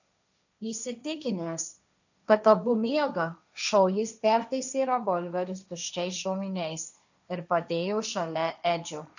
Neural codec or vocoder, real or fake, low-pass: codec, 16 kHz, 1.1 kbps, Voila-Tokenizer; fake; 7.2 kHz